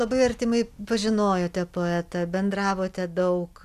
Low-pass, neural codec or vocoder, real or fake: 14.4 kHz; none; real